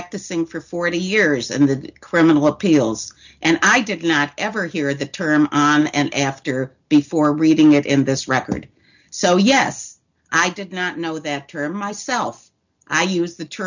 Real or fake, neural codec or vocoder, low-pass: real; none; 7.2 kHz